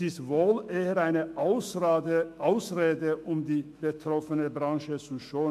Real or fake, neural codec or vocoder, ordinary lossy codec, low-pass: fake; autoencoder, 48 kHz, 128 numbers a frame, DAC-VAE, trained on Japanese speech; MP3, 64 kbps; 14.4 kHz